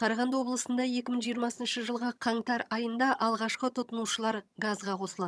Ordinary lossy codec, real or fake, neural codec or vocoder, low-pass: none; fake; vocoder, 22.05 kHz, 80 mel bands, HiFi-GAN; none